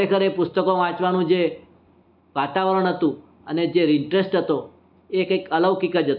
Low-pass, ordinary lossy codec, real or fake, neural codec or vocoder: 5.4 kHz; none; real; none